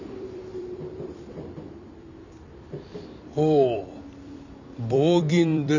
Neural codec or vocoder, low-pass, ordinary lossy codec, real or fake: vocoder, 44.1 kHz, 128 mel bands every 256 samples, BigVGAN v2; 7.2 kHz; none; fake